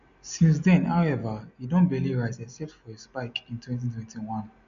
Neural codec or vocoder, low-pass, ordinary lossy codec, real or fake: none; 7.2 kHz; MP3, 96 kbps; real